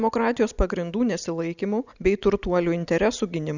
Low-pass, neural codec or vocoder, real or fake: 7.2 kHz; none; real